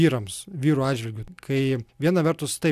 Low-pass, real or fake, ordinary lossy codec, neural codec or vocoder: 14.4 kHz; real; AAC, 96 kbps; none